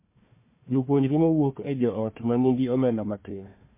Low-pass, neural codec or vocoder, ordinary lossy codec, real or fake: 3.6 kHz; codec, 16 kHz, 1 kbps, FunCodec, trained on Chinese and English, 50 frames a second; MP3, 24 kbps; fake